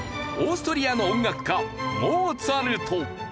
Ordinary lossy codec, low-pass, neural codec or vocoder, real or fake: none; none; none; real